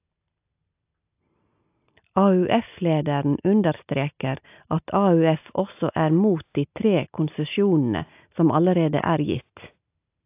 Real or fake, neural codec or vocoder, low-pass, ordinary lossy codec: real; none; 3.6 kHz; AAC, 32 kbps